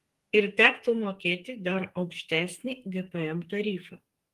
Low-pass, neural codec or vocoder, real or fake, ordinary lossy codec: 14.4 kHz; codec, 44.1 kHz, 2.6 kbps, SNAC; fake; Opus, 24 kbps